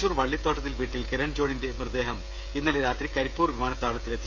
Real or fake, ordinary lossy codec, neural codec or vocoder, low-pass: fake; none; codec, 16 kHz, 16 kbps, FreqCodec, smaller model; none